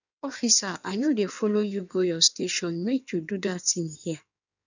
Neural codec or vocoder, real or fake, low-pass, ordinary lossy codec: codec, 16 kHz in and 24 kHz out, 1.1 kbps, FireRedTTS-2 codec; fake; 7.2 kHz; none